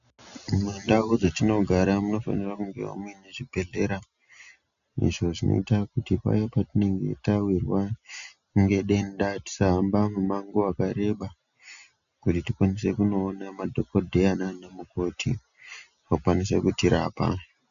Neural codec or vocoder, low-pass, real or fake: none; 7.2 kHz; real